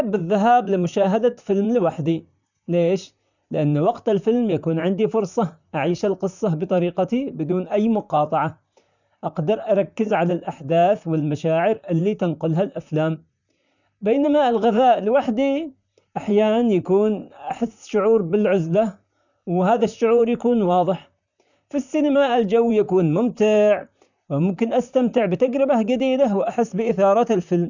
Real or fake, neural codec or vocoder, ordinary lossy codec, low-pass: fake; vocoder, 44.1 kHz, 128 mel bands, Pupu-Vocoder; none; 7.2 kHz